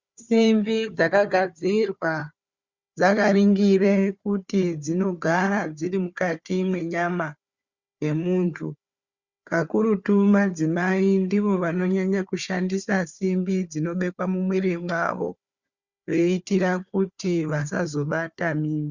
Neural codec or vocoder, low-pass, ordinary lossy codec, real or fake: codec, 16 kHz, 4 kbps, FunCodec, trained on Chinese and English, 50 frames a second; 7.2 kHz; Opus, 64 kbps; fake